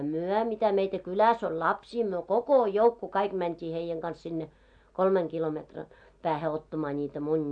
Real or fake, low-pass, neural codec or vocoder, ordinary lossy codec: real; 9.9 kHz; none; AAC, 64 kbps